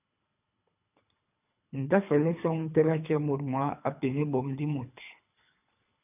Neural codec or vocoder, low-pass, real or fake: codec, 24 kHz, 3 kbps, HILCodec; 3.6 kHz; fake